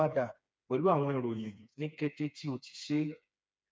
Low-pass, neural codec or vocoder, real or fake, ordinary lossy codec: none; codec, 16 kHz, 4 kbps, FreqCodec, smaller model; fake; none